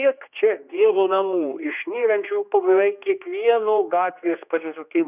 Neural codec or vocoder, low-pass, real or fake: codec, 16 kHz, 2 kbps, X-Codec, HuBERT features, trained on general audio; 3.6 kHz; fake